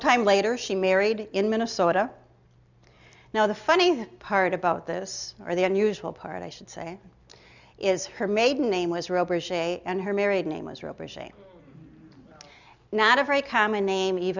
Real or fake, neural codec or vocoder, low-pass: real; none; 7.2 kHz